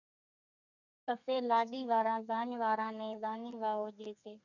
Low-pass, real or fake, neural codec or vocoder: 7.2 kHz; fake; codec, 32 kHz, 1.9 kbps, SNAC